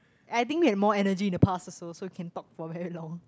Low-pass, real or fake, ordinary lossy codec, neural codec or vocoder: none; real; none; none